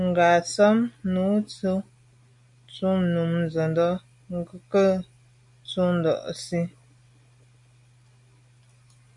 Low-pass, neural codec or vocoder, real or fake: 10.8 kHz; none; real